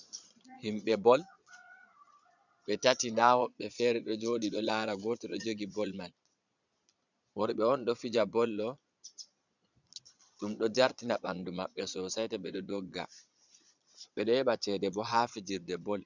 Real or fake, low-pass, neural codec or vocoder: fake; 7.2 kHz; vocoder, 22.05 kHz, 80 mel bands, Vocos